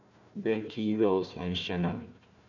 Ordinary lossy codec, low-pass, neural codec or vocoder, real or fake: none; 7.2 kHz; codec, 16 kHz, 1 kbps, FunCodec, trained on Chinese and English, 50 frames a second; fake